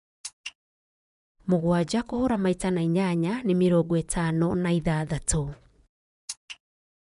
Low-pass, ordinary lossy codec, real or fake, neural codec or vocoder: 10.8 kHz; none; fake; vocoder, 24 kHz, 100 mel bands, Vocos